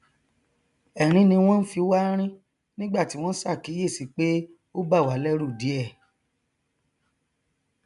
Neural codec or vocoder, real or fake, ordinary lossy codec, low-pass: none; real; none; 10.8 kHz